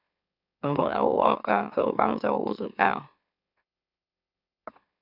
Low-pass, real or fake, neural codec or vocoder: 5.4 kHz; fake; autoencoder, 44.1 kHz, a latent of 192 numbers a frame, MeloTTS